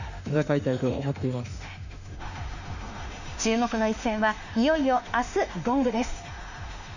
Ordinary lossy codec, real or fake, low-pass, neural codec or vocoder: none; fake; 7.2 kHz; autoencoder, 48 kHz, 32 numbers a frame, DAC-VAE, trained on Japanese speech